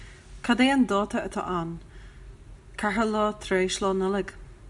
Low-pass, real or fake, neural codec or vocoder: 10.8 kHz; real; none